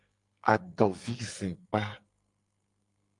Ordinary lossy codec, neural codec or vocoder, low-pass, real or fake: Opus, 32 kbps; codec, 44.1 kHz, 2.6 kbps, SNAC; 10.8 kHz; fake